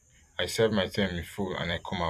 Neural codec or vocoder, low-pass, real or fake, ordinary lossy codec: vocoder, 44.1 kHz, 128 mel bands every 256 samples, BigVGAN v2; 14.4 kHz; fake; none